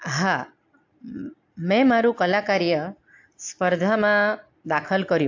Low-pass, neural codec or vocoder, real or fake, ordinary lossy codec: 7.2 kHz; none; real; AAC, 48 kbps